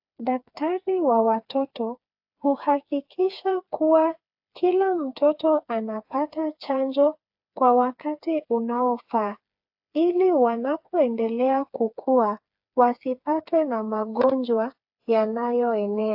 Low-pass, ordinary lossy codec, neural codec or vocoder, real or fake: 5.4 kHz; AAC, 48 kbps; codec, 16 kHz, 4 kbps, FreqCodec, smaller model; fake